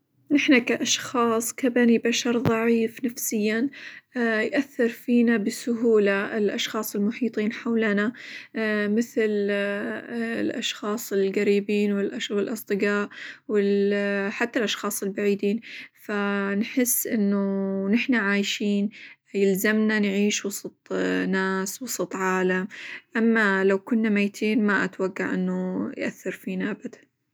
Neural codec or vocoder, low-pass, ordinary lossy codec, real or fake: none; none; none; real